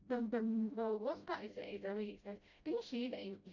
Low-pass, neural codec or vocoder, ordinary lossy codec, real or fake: 7.2 kHz; codec, 16 kHz, 0.5 kbps, FreqCodec, smaller model; none; fake